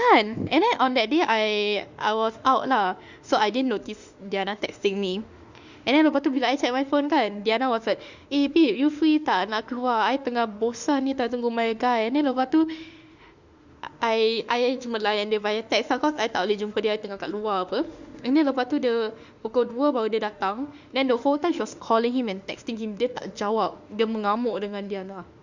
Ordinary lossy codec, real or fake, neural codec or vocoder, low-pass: Opus, 64 kbps; fake; autoencoder, 48 kHz, 32 numbers a frame, DAC-VAE, trained on Japanese speech; 7.2 kHz